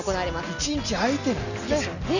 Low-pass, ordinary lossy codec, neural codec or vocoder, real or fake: 7.2 kHz; none; none; real